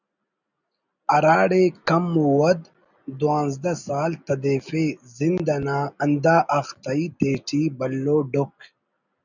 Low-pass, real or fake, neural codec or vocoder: 7.2 kHz; real; none